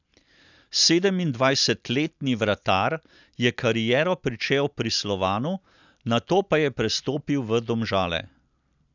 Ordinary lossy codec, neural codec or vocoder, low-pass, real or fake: none; none; 7.2 kHz; real